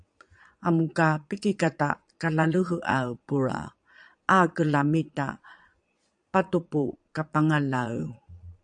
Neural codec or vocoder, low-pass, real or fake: vocoder, 22.05 kHz, 80 mel bands, Vocos; 9.9 kHz; fake